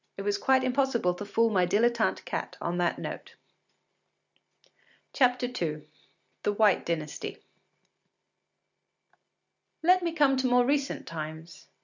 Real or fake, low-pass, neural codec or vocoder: real; 7.2 kHz; none